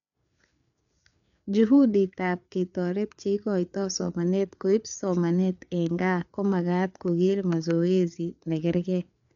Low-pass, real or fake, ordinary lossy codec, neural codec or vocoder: 7.2 kHz; fake; none; codec, 16 kHz, 4 kbps, FreqCodec, larger model